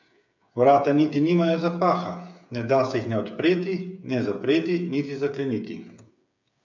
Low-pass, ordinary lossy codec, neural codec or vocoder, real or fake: 7.2 kHz; none; codec, 16 kHz, 8 kbps, FreqCodec, smaller model; fake